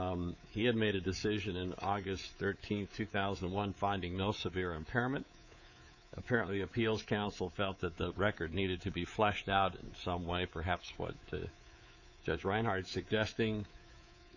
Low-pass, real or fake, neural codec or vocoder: 7.2 kHz; fake; codec, 24 kHz, 3.1 kbps, DualCodec